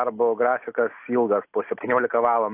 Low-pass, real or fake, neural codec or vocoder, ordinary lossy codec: 3.6 kHz; real; none; AAC, 32 kbps